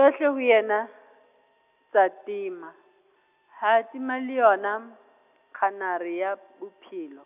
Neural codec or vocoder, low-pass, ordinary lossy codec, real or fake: none; 3.6 kHz; none; real